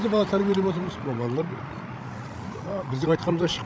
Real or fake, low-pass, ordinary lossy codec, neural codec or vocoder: fake; none; none; codec, 16 kHz, 16 kbps, FreqCodec, larger model